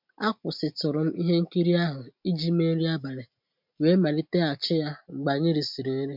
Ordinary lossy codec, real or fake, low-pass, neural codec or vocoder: MP3, 48 kbps; real; 5.4 kHz; none